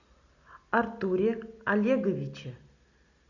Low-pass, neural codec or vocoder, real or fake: 7.2 kHz; none; real